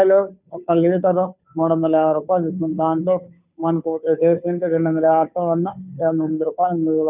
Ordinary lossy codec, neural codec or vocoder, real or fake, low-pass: none; codec, 16 kHz, 2 kbps, FunCodec, trained on Chinese and English, 25 frames a second; fake; 3.6 kHz